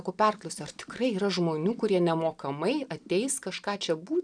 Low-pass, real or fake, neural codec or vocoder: 9.9 kHz; real; none